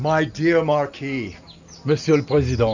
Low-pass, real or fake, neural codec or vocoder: 7.2 kHz; real; none